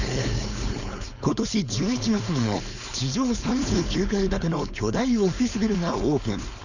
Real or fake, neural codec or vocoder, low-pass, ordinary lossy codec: fake; codec, 16 kHz, 4.8 kbps, FACodec; 7.2 kHz; none